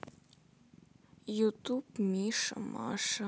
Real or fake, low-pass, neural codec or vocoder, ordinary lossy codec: real; none; none; none